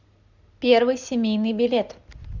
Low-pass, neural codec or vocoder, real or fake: 7.2 kHz; none; real